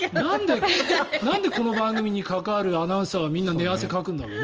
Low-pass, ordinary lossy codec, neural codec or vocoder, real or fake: 7.2 kHz; Opus, 24 kbps; none; real